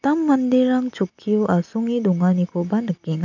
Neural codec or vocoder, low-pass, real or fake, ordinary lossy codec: none; 7.2 kHz; real; none